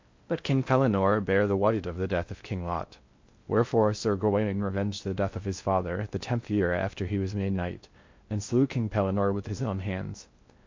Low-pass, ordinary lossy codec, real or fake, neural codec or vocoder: 7.2 kHz; MP3, 48 kbps; fake; codec, 16 kHz in and 24 kHz out, 0.6 kbps, FocalCodec, streaming, 2048 codes